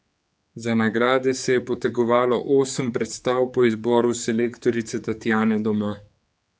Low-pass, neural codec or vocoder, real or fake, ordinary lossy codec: none; codec, 16 kHz, 4 kbps, X-Codec, HuBERT features, trained on general audio; fake; none